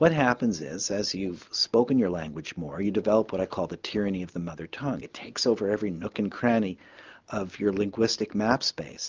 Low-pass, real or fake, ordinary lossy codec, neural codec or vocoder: 7.2 kHz; real; Opus, 32 kbps; none